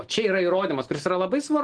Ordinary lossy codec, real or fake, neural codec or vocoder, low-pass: Opus, 16 kbps; real; none; 9.9 kHz